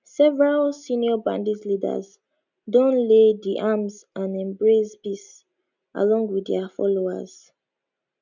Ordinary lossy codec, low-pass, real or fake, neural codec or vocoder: none; 7.2 kHz; real; none